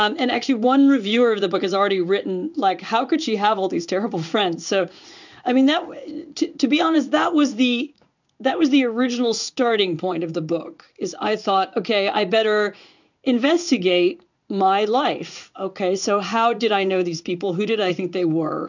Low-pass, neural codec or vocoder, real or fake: 7.2 kHz; codec, 16 kHz in and 24 kHz out, 1 kbps, XY-Tokenizer; fake